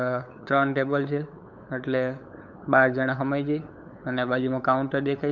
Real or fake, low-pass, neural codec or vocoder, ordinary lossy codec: fake; 7.2 kHz; codec, 16 kHz, 8 kbps, FunCodec, trained on LibriTTS, 25 frames a second; none